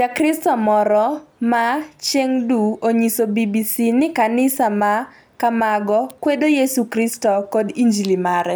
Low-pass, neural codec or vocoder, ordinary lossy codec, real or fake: none; none; none; real